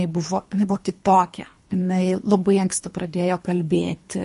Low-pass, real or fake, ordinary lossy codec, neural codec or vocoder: 10.8 kHz; fake; MP3, 48 kbps; codec, 24 kHz, 3 kbps, HILCodec